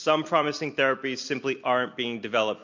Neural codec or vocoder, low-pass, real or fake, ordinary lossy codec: none; 7.2 kHz; real; MP3, 64 kbps